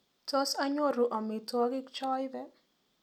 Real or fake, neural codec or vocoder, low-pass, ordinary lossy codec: real; none; 19.8 kHz; none